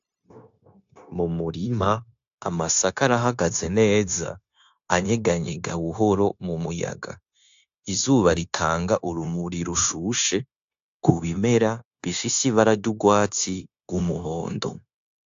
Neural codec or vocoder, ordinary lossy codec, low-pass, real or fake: codec, 16 kHz, 0.9 kbps, LongCat-Audio-Codec; AAC, 64 kbps; 7.2 kHz; fake